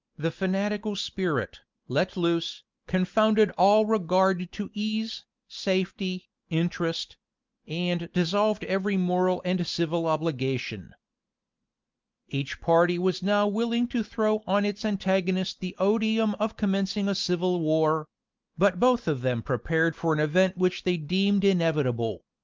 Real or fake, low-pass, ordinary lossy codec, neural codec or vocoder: real; 7.2 kHz; Opus, 32 kbps; none